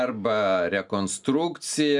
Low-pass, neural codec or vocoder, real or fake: 10.8 kHz; none; real